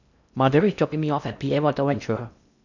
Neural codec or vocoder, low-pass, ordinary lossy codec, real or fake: codec, 16 kHz in and 24 kHz out, 0.8 kbps, FocalCodec, streaming, 65536 codes; 7.2 kHz; none; fake